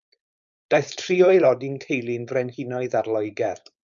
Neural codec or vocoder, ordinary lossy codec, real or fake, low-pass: codec, 16 kHz, 4.8 kbps, FACodec; MP3, 96 kbps; fake; 7.2 kHz